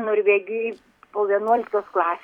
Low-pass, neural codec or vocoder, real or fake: 19.8 kHz; vocoder, 44.1 kHz, 128 mel bands every 256 samples, BigVGAN v2; fake